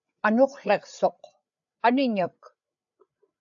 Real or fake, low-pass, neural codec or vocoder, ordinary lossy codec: fake; 7.2 kHz; codec, 16 kHz, 8 kbps, FreqCodec, larger model; AAC, 64 kbps